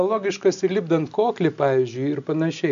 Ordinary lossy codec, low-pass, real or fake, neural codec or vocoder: AAC, 96 kbps; 7.2 kHz; real; none